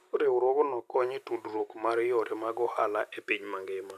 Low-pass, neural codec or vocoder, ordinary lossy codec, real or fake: 14.4 kHz; none; none; real